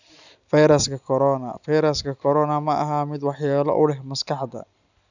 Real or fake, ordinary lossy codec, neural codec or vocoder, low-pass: real; none; none; 7.2 kHz